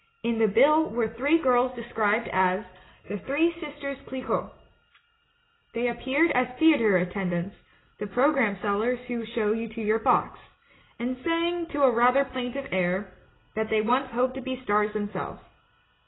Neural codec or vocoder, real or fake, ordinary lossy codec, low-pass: none; real; AAC, 16 kbps; 7.2 kHz